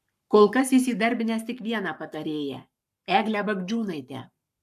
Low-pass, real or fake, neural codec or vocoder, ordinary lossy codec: 14.4 kHz; fake; codec, 44.1 kHz, 7.8 kbps, Pupu-Codec; AAC, 96 kbps